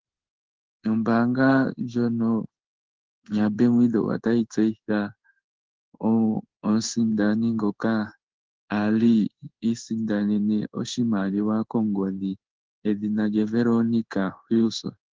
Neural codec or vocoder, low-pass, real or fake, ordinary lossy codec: codec, 16 kHz in and 24 kHz out, 1 kbps, XY-Tokenizer; 7.2 kHz; fake; Opus, 16 kbps